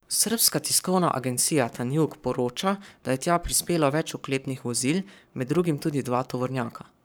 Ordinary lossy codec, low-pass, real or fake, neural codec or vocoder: none; none; fake; codec, 44.1 kHz, 7.8 kbps, Pupu-Codec